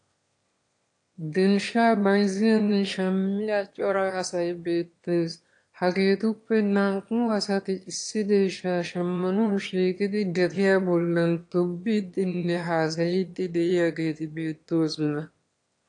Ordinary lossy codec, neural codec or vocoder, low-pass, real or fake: AAC, 48 kbps; autoencoder, 22.05 kHz, a latent of 192 numbers a frame, VITS, trained on one speaker; 9.9 kHz; fake